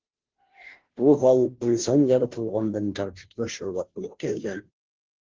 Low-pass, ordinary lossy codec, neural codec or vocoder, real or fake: 7.2 kHz; Opus, 16 kbps; codec, 16 kHz, 0.5 kbps, FunCodec, trained on Chinese and English, 25 frames a second; fake